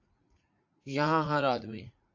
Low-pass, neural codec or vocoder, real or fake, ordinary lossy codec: 7.2 kHz; vocoder, 44.1 kHz, 80 mel bands, Vocos; fake; AAC, 48 kbps